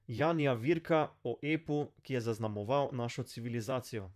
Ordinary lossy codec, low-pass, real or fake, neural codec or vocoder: none; 14.4 kHz; fake; vocoder, 44.1 kHz, 128 mel bands, Pupu-Vocoder